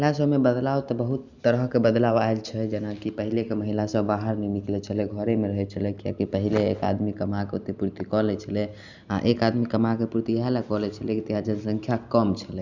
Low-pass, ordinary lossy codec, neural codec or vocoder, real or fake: 7.2 kHz; none; none; real